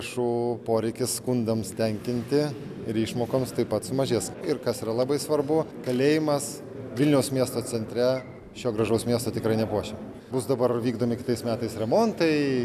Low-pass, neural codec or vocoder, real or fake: 14.4 kHz; none; real